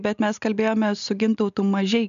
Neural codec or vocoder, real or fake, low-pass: none; real; 7.2 kHz